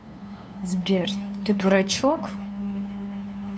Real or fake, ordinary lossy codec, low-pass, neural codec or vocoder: fake; none; none; codec, 16 kHz, 2 kbps, FunCodec, trained on LibriTTS, 25 frames a second